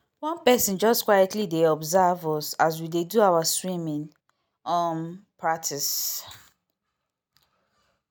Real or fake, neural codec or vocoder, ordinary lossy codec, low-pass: real; none; none; none